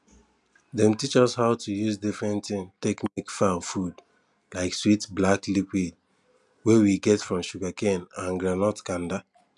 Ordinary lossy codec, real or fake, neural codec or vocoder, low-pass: none; real; none; 10.8 kHz